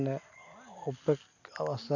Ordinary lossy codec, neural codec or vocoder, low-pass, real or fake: none; none; 7.2 kHz; real